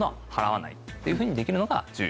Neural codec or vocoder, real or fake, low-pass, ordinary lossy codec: none; real; none; none